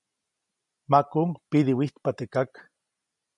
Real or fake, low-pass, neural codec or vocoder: real; 10.8 kHz; none